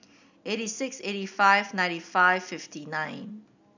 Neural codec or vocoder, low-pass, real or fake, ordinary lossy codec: none; 7.2 kHz; real; none